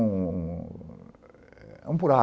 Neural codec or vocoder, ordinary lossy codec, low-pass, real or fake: none; none; none; real